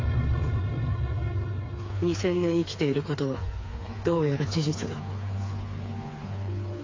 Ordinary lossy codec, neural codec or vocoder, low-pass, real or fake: AAC, 32 kbps; codec, 16 kHz, 4 kbps, FreqCodec, smaller model; 7.2 kHz; fake